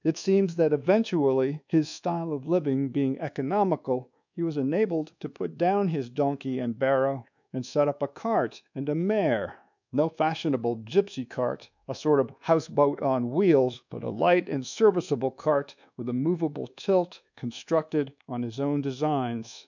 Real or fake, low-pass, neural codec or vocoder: fake; 7.2 kHz; codec, 24 kHz, 1.2 kbps, DualCodec